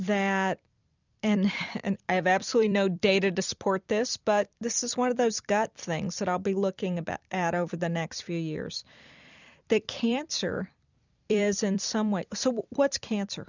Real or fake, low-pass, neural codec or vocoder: fake; 7.2 kHz; vocoder, 44.1 kHz, 128 mel bands every 256 samples, BigVGAN v2